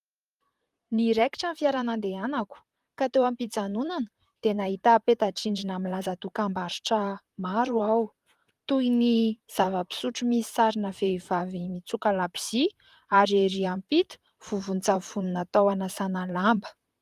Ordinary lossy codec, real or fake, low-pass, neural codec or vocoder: Opus, 32 kbps; fake; 14.4 kHz; vocoder, 44.1 kHz, 128 mel bands, Pupu-Vocoder